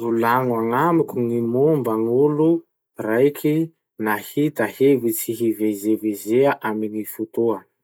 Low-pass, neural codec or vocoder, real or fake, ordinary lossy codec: none; none; real; none